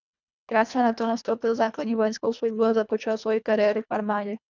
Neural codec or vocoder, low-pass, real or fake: codec, 24 kHz, 1.5 kbps, HILCodec; 7.2 kHz; fake